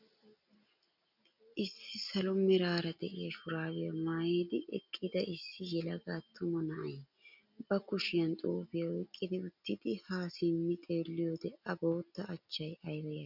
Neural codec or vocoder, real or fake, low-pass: none; real; 5.4 kHz